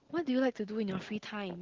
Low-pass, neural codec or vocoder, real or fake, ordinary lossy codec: 7.2 kHz; none; real; Opus, 16 kbps